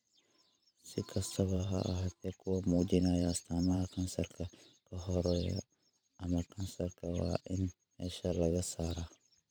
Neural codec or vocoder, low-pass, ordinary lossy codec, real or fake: vocoder, 44.1 kHz, 128 mel bands every 512 samples, BigVGAN v2; none; none; fake